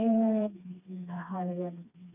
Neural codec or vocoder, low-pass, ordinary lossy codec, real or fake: codec, 16 kHz, 2 kbps, FreqCodec, smaller model; 3.6 kHz; none; fake